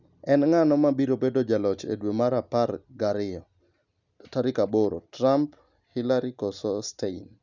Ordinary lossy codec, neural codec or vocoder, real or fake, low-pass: none; none; real; 7.2 kHz